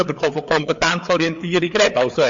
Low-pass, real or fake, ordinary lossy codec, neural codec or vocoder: 7.2 kHz; fake; MP3, 48 kbps; codec, 16 kHz, 4 kbps, FreqCodec, larger model